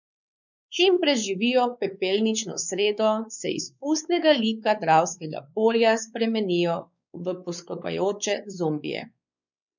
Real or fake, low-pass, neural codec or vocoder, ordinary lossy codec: fake; 7.2 kHz; codec, 16 kHz, 4 kbps, X-Codec, WavLM features, trained on Multilingual LibriSpeech; none